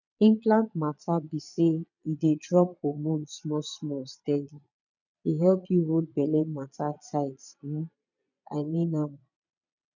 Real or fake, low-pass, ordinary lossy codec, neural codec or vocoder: fake; 7.2 kHz; none; vocoder, 22.05 kHz, 80 mel bands, Vocos